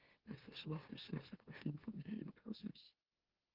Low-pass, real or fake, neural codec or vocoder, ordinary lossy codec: 5.4 kHz; fake; autoencoder, 44.1 kHz, a latent of 192 numbers a frame, MeloTTS; Opus, 16 kbps